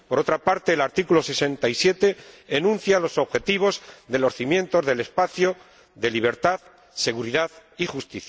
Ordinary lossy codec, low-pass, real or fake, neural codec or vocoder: none; none; real; none